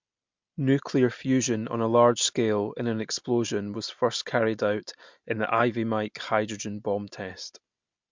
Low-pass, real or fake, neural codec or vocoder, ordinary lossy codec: 7.2 kHz; real; none; MP3, 64 kbps